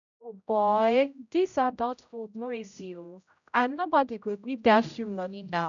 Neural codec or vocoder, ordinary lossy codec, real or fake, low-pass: codec, 16 kHz, 0.5 kbps, X-Codec, HuBERT features, trained on general audio; none; fake; 7.2 kHz